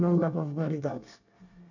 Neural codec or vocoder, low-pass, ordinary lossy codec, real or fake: codec, 24 kHz, 1 kbps, SNAC; 7.2 kHz; none; fake